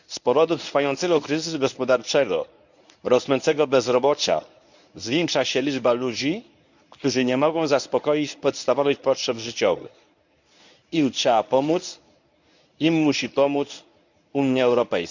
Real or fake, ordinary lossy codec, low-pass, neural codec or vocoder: fake; none; 7.2 kHz; codec, 24 kHz, 0.9 kbps, WavTokenizer, medium speech release version 1